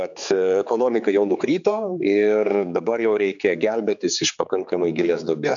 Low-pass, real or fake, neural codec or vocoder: 7.2 kHz; fake; codec, 16 kHz, 4 kbps, X-Codec, HuBERT features, trained on general audio